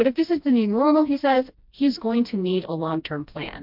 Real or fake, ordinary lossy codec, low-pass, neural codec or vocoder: fake; MP3, 48 kbps; 5.4 kHz; codec, 16 kHz, 1 kbps, FreqCodec, smaller model